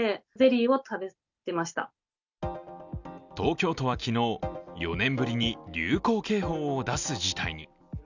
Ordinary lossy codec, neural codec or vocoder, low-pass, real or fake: none; none; 7.2 kHz; real